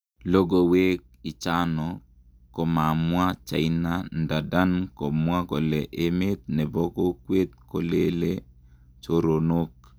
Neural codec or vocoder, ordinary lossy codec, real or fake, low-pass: none; none; real; none